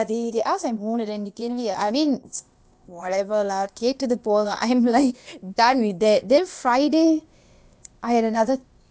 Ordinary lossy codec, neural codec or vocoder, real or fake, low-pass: none; codec, 16 kHz, 0.8 kbps, ZipCodec; fake; none